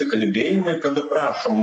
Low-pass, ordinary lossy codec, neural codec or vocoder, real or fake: 10.8 kHz; MP3, 48 kbps; codec, 44.1 kHz, 3.4 kbps, Pupu-Codec; fake